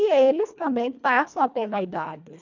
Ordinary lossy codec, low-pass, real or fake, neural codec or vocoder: none; 7.2 kHz; fake; codec, 24 kHz, 1.5 kbps, HILCodec